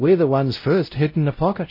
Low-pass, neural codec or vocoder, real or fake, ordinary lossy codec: 5.4 kHz; codec, 16 kHz, 0.5 kbps, X-Codec, WavLM features, trained on Multilingual LibriSpeech; fake; MP3, 24 kbps